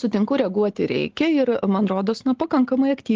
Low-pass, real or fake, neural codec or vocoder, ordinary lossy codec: 7.2 kHz; real; none; Opus, 16 kbps